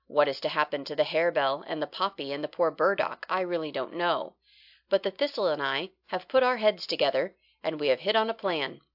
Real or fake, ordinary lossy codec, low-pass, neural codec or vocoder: real; AAC, 48 kbps; 5.4 kHz; none